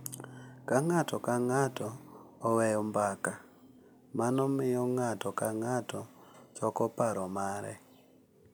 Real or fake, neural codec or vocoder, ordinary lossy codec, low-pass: real; none; none; none